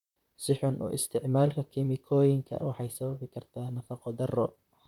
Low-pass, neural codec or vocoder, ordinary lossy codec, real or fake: 19.8 kHz; vocoder, 44.1 kHz, 128 mel bands, Pupu-Vocoder; none; fake